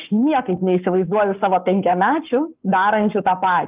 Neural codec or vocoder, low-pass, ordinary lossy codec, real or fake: codec, 44.1 kHz, 7.8 kbps, Pupu-Codec; 3.6 kHz; Opus, 24 kbps; fake